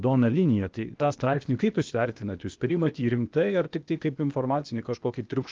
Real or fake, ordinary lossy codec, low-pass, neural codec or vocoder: fake; Opus, 32 kbps; 7.2 kHz; codec, 16 kHz, 0.8 kbps, ZipCodec